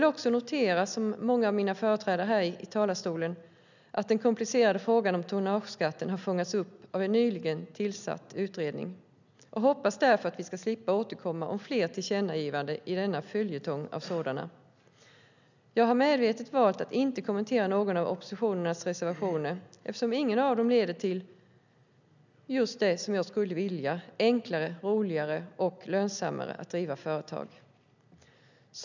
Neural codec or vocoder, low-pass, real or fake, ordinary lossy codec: none; 7.2 kHz; real; none